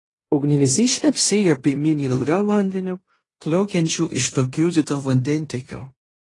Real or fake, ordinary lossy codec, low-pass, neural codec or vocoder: fake; AAC, 32 kbps; 10.8 kHz; codec, 16 kHz in and 24 kHz out, 0.9 kbps, LongCat-Audio-Codec, fine tuned four codebook decoder